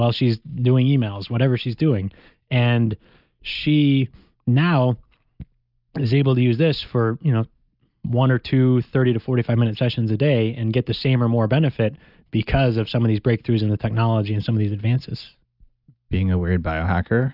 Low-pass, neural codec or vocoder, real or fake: 5.4 kHz; none; real